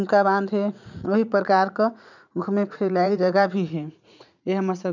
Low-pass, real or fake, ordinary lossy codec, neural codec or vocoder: 7.2 kHz; fake; none; vocoder, 44.1 kHz, 80 mel bands, Vocos